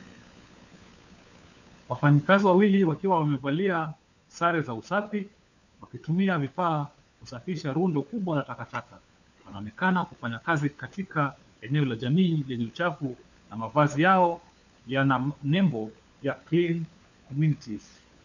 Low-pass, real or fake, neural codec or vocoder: 7.2 kHz; fake; codec, 16 kHz, 4 kbps, FunCodec, trained on LibriTTS, 50 frames a second